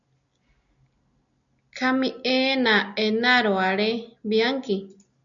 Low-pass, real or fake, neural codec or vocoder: 7.2 kHz; real; none